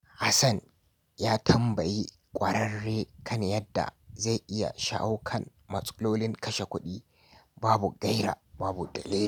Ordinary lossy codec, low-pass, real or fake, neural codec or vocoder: none; 19.8 kHz; real; none